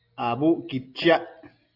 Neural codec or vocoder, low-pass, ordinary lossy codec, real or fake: none; 5.4 kHz; AAC, 32 kbps; real